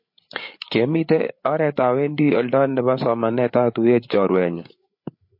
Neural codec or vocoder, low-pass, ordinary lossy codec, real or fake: codec, 16 kHz, 8 kbps, FreqCodec, larger model; 5.4 kHz; MP3, 32 kbps; fake